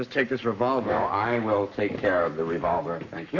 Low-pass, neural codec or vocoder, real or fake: 7.2 kHz; codec, 44.1 kHz, 7.8 kbps, Pupu-Codec; fake